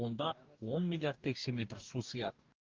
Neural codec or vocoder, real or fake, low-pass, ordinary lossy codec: codec, 44.1 kHz, 2.6 kbps, DAC; fake; 7.2 kHz; Opus, 32 kbps